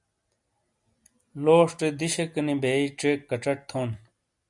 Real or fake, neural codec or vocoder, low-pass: real; none; 10.8 kHz